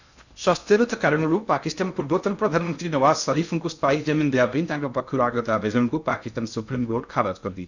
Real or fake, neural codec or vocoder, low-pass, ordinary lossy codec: fake; codec, 16 kHz in and 24 kHz out, 0.6 kbps, FocalCodec, streaming, 2048 codes; 7.2 kHz; none